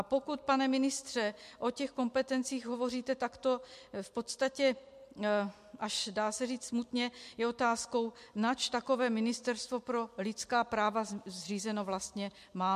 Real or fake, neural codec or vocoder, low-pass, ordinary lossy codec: real; none; 14.4 kHz; MP3, 64 kbps